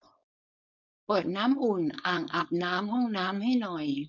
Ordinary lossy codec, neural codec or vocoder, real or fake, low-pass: none; codec, 16 kHz, 4.8 kbps, FACodec; fake; 7.2 kHz